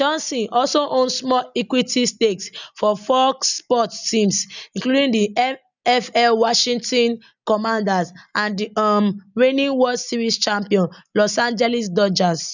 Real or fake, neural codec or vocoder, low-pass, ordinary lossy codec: real; none; 7.2 kHz; none